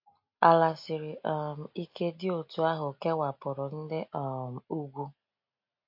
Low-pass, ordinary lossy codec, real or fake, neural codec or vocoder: 5.4 kHz; MP3, 32 kbps; real; none